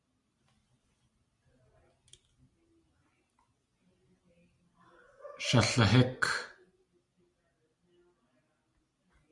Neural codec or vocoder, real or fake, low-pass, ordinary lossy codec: none; real; 10.8 kHz; Opus, 64 kbps